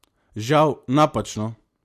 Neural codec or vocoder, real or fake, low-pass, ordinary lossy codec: none; real; 14.4 kHz; MP3, 64 kbps